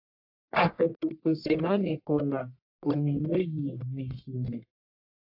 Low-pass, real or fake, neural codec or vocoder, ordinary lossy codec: 5.4 kHz; fake; codec, 44.1 kHz, 1.7 kbps, Pupu-Codec; AAC, 48 kbps